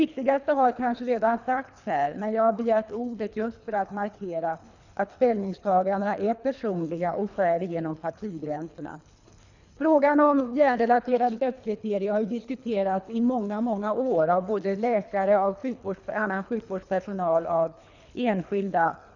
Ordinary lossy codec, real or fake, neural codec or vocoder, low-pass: none; fake; codec, 24 kHz, 3 kbps, HILCodec; 7.2 kHz